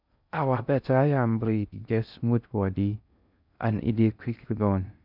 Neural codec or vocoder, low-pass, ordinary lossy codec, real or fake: codec, 16 kHz in and 24 kHz out, 0.6 kbps, FocalCodec, streaming, 2048 codes; 5.4 kHz; none; fake